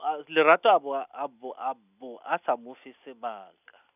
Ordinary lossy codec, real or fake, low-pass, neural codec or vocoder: none; real; 3.6 kHz; none